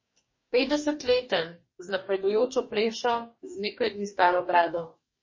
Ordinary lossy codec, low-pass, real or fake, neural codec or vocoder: MP3, 32 kbps; 7.2 kHz; fake; codec, 44.1 kHz, 2.6 kbps, DAC